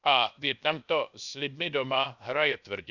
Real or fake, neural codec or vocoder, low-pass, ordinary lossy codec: fake; codec, 16 kHz, 0.7 kbps, FocalCodec; 7.2 kHz; none